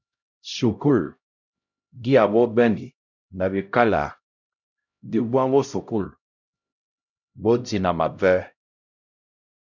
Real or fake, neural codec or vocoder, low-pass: fake; codec, 16 kHz, 0.5 kbps, X-Codec, HuBERT features, trained on LibriSpeech; 7.2 kHz